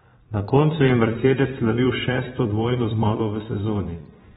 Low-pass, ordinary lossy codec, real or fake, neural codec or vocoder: 9.9 kHz; AAC, 16 kbps; fake; vocoder, 22.05 kHz, 80 mel bands, Vocos